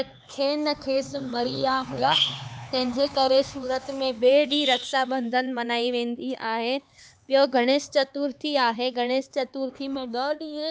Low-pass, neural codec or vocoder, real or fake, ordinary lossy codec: none; codec, 16 kHz, 4 kbps, X-Codec, HuBERT features, trained on LibriSpeech; fake; none